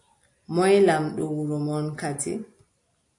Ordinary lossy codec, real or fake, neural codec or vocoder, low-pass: AAC, 48 kbps; real; none; 10.8 kHz